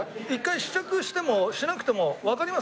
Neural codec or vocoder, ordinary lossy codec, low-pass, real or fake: none; none; none; real